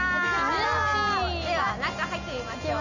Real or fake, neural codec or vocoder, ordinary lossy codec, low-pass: real; none; none; 7.2 kHz